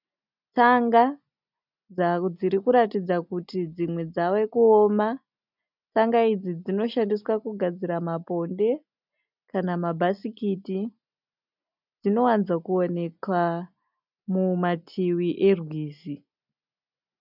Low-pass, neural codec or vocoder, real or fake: 5.4 kHz; none; real